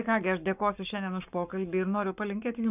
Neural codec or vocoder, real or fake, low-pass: codec, 44.1 kHz, 7.8 kbps, Pupu-Codec; fake; 3.6 kHz